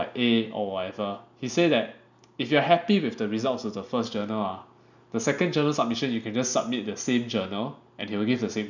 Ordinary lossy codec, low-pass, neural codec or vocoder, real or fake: none; 7.2 kHz; none; real